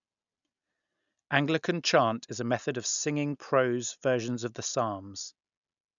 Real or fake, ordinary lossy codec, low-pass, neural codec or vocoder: real; none; 7.2 kHz; none